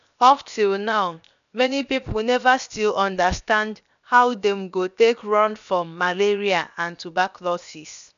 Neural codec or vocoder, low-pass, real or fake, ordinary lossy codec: codec, 16 kHz, 0.7 kbps, FocalCodec; 7.2 kHz; fake; none